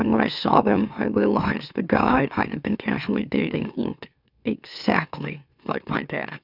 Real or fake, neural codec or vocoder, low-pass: fake; autoencoder, 44.1 kHz, a latent of 192 numbers a frame, MeloTTS; 5.4 kHz